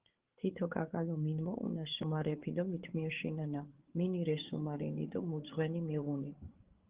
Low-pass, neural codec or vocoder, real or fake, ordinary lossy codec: 3.6 kHz; codec, 16 kHz, 8 kbps, FreqCodec, larger model; fake; Opus, 16 kbps